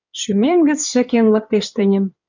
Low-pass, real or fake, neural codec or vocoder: 7.2 kHz; fake; codec, 16 kHz in and 24 kHz out, 2.2 kbps, FireRedTTS-2 codec